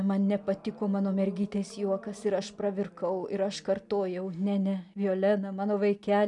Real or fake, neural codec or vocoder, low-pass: real; none; 10.8 kHz